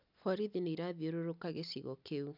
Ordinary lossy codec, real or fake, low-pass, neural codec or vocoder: none; real; 5.4 kHz; none